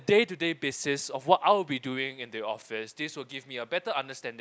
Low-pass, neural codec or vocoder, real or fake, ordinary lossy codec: none; none; real; none